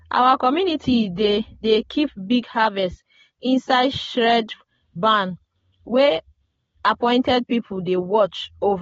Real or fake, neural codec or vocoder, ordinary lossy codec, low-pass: real; none; AAC, 24 kbps; 10.8 kHz